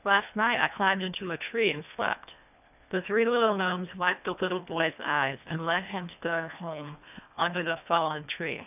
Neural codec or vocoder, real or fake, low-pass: codec, 24 kHz, 1.5 kbps, HILCodec; fake; 3.6 kHz